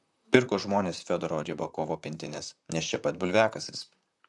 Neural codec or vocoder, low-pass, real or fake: vocoder, 44.1 kHz, 128 mel bands, Pupu-Vocoder; 10.8 kHz; fake